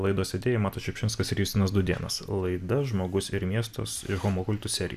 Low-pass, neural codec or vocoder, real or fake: 14.4 kHz; none; real